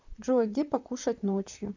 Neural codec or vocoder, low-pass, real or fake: vocoder, 44.1 kHz, 128 mel bands, Pupu-Vocoder; 7.2 kHz; fake